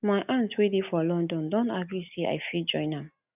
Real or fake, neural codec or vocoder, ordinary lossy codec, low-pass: real; none; none; 3.6 kHz